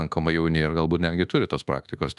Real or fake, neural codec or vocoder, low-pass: fake; codec, 24 kHz, 1.2 kbps, DualCodec; 10.8 kHz